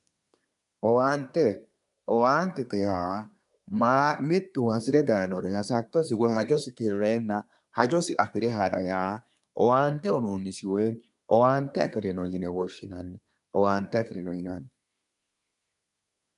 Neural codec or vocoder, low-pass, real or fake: codec, 24 kHz, 1 kbps, SNAC; 10.8 kHz; fake